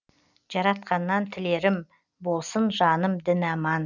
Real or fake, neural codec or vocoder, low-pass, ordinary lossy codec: real; none; 7.2 kHz; none